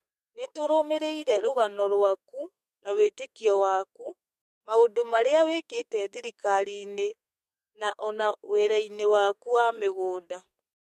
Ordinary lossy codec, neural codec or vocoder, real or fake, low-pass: MP3, 64 kbps; codec, 32 kHz, 1.9 kbps, SNAC; fake; 14.4 kHz